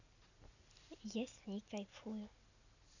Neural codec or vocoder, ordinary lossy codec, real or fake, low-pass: none; none; real; 7.2 kHz